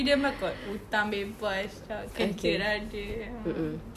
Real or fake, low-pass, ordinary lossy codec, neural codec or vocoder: real; 14.4 kHz; Opus, 64 kbps; none